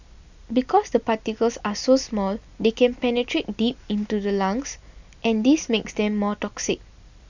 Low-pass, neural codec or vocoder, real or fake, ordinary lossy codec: 7.2 kHz; none; real; none